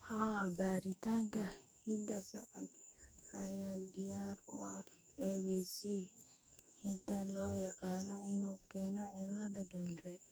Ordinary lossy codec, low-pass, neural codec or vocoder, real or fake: none; none; codec, 44.1 kHz, 2.6 kbps, DAC; fake